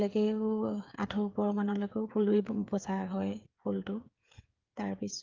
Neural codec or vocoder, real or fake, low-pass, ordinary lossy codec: codec, 16 kHz, 8 kbps, FreqCodec, smaller model; fake; 7.2 kHz; Opus, 32 kbps